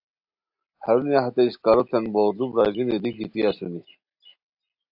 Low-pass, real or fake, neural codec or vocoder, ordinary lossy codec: 5.4 kHz; real; none; AAC, 48 kbps